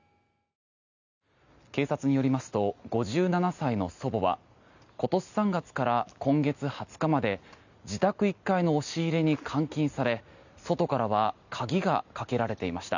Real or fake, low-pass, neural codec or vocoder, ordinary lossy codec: real; 7.2 kHz; none; none